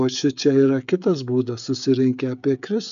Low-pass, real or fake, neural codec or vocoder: 7.2 kHz; fake; codec, 16 kHz, 16 kbps, FreqCodec, smaller model